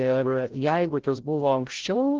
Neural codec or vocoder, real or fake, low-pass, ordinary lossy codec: codec, 16 kHz, 0.5 kbps, FreqCodec, larger model; fake; 7.2 kHz; Opus, 16 kbps